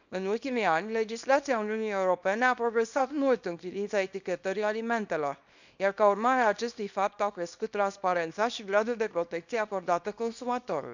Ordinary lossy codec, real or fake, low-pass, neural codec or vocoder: none; fake; 7.2 kHz; codec, 24 kHz, 0.9 kbps, WavTokenizer, small release